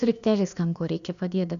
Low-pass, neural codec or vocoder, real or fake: 7.2 kHz; codec, 16 kHz, about 1 kbps, DyCAST, with the encoder's durations; fake